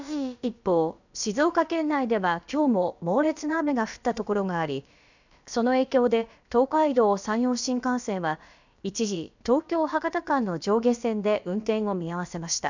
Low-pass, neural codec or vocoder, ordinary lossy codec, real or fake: 7.2 kHz; codec, 16 kHz, about 1 kbps, DyCAST, with the encoder's durations; none; fake